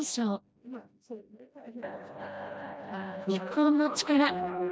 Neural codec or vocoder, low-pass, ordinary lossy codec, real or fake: codec, 16 kHz, 1 kbps, FreqCodec, smaller model; none; none; fake